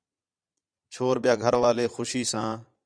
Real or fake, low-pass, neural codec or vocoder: fake; 9.9 kHz; vocoder, 22.05 kHz, 80 mel bands, Vocos